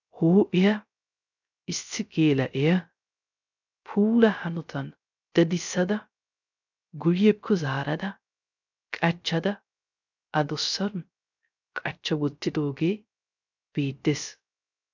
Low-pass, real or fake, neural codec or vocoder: 7.2 kHz; fake; codec, 16 kHz, 0.3 kbps, FocalCodec